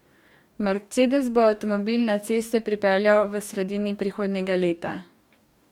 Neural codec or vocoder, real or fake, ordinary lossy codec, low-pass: codec, 44.1 kHz, 2.6 kbps, DAC; fake; MP3, 96 kbps; 19.8 kHz